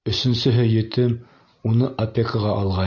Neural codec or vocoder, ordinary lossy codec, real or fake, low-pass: none; MP3, 48 kbps; real; 7.2 kHz